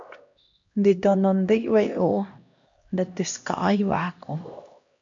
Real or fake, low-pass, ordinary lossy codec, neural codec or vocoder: fake; 7.2 kHz; AAC, 64 kbps; codec, 16 kHz, 1 kbps, X-Codec, HuBERT features, trained on LibriSpeech